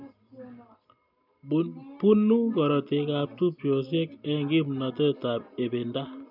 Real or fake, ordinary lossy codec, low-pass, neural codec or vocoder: real; none; 5.4 kHz; none